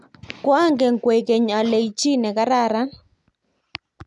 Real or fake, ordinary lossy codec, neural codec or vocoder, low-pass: real; none; none; 10.8 kHz